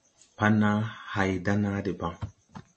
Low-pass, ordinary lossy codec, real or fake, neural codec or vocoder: 10.8 kHz; MP3, 32 kbps; real; none